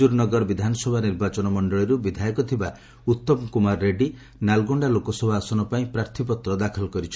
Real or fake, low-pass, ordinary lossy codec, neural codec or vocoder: real; none; none; none